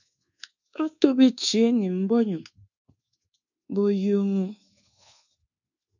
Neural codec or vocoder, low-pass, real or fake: codec, 24 kHz, 1.2 kbps, DualCodec; 7.2 kHz; fake